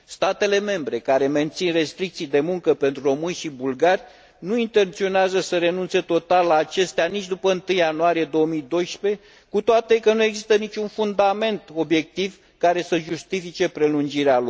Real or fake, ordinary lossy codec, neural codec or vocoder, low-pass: real; none; none; none